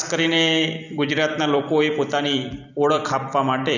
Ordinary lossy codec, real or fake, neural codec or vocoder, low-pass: none; fake; vocoder, 44.1 kHz, 128 mel bands every 256 samples, BigVGAN v2; 7.2 kHz